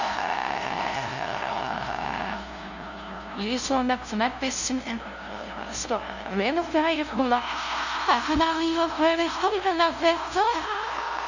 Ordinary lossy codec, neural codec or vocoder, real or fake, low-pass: none; codec, 16 kHz, 0.5 kbps, FunCodec, trained on LibriTTS, 25 frames a second; fake; 7.2 kHz